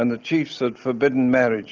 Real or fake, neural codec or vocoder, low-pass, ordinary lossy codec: real; none; 7.2 kHz; Opus, 32 kbps